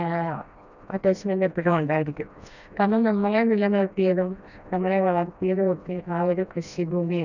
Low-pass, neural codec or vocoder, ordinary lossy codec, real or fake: 7.2 kHz; codec, 16 kHz, 1 kbps, FreqCodec, smaller model; none; fake